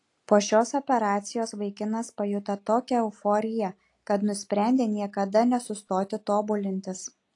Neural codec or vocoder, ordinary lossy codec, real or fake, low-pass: none; AAC, 48 kbps; real; 10.8 kHz